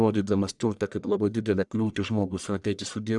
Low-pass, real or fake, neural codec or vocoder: 10.8 kHz; fake; codec, 44.1 kHz, 1.7 kbps, Pupu-Codec